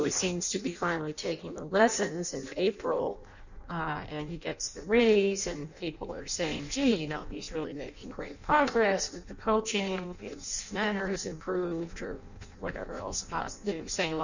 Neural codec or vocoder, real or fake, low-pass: codec, 16 kHz in and 24 kHz out, 0.6 kbps, FireRedTTS-2 codec; fake; 7.2 kHz